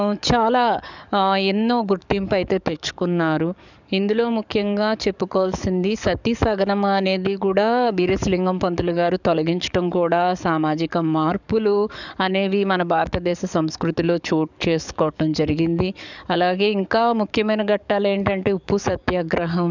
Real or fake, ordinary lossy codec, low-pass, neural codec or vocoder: fake; none; 7.2 kHz; codec, 44.1 kHz, 7.8 kbps, DAC